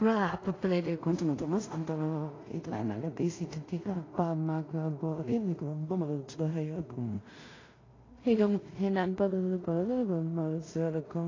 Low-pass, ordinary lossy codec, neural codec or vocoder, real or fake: 7.2 kHz; AAC, 32 kbps; codec, 16 kHz in and 24 kHz out, 0.4 kbps, LongCat-Audio-Codec, two codebook decoder; fake